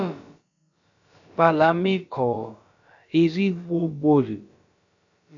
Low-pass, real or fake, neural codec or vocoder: 7.2 kHz; fake; codec, 16 kHz, about 1 kbps, DyCAST, with the encoder's durations